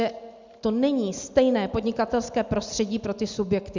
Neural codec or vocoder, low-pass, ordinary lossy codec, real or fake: none; 7.2 kHz; Opus, 64 kbps; real